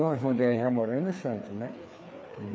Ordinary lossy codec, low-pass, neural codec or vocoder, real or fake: none; none; codec, 16 kHz, 4 kbps, FreqCodec, larger model; fake